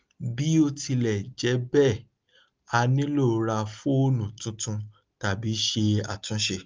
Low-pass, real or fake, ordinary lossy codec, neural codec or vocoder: 7.2 kHz; real; Opus, 32 kbps; none